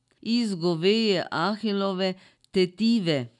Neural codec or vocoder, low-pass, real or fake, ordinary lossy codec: none; 10.8 kHz; real; none